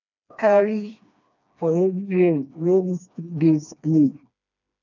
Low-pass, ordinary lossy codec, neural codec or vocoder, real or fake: 7.2 kHz; none; codec, 16 kHz, 2 kbps, FreqCodec, smaller model; fake